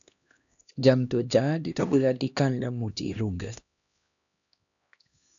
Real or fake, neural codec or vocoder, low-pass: fake; codec, 16 kHz, 1 kbps, X-Codec, HuBERT features, trained on LibriSpeech; 7.2 kHz